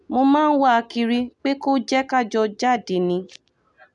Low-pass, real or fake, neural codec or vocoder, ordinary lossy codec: 10.8 kHz; real; none; none